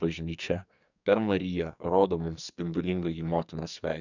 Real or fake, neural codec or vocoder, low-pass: fake; codec, 44.1 kHz, 2.6 kbps, SNAC; 7.2 kHz